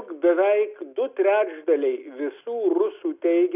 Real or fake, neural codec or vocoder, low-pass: real; none; 3.6 kHz